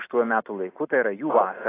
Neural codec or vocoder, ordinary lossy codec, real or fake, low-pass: none; AAC, 16 kbps; real; 3.6 kHz